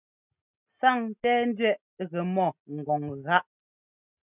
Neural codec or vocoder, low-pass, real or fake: none; 3.6 kHz; real